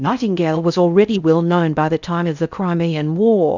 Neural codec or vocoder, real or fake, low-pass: codec, 16 kHz in and 24 kHz out, 0.6 kbps, FocalCodec, streaming, 4096 codes; fake; 7.2 kHz